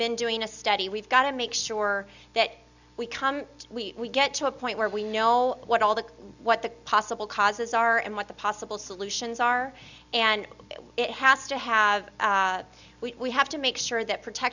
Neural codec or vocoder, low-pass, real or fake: none; 7.2 kHz; real